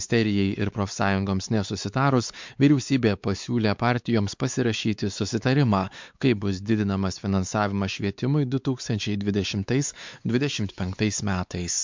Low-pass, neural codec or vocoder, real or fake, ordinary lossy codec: 7.2 kHz; codec, 16 kHz, 4 kbps, X-Codec, WavLM features, trained on Multilingual LibriSpeech; fake; MP3, 64 kbps